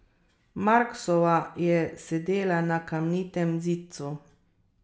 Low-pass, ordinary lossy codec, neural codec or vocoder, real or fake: none; none; none; real